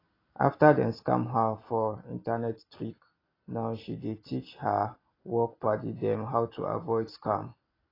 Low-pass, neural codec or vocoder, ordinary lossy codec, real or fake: 5.4 kHz; none; AAC, 24 kbps; real